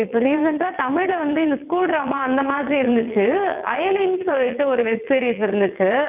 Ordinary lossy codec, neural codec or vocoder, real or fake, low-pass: none; vocoder, 22.05 kHz, 80 mel bands, WaveNeXt; fake; 3.6 kHz